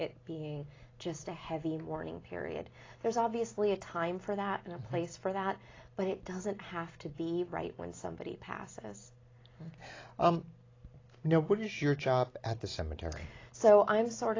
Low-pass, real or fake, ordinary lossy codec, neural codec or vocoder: 7.2 kHz; real; AAC, 32 kbps; none